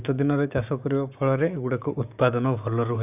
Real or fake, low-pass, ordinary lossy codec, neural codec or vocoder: fake; 3.6 kHz; none; codec, 16 kHz, 6 kbps, DAC